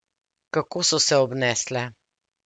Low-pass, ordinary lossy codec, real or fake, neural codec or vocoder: 9.9 kHz; MP3, 64 kbps; real; none